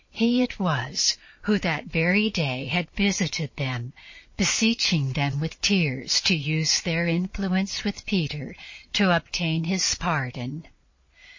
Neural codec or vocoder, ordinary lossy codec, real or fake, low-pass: vocoder, 22.05 kHz, 80 mel bands, Vocos; MP3, 32 kbps; fake; 7.2 kHz